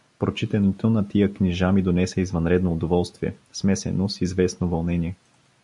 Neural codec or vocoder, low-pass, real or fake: none; 10.8 kHz; real